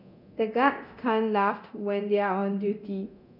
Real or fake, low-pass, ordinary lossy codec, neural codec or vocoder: fake; 5.4 kHz; none; codec, 24 kHz, 0.9 kbps, DualCodec